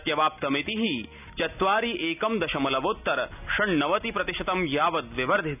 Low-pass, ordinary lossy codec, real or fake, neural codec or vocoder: 3.6 kHz; none; real; none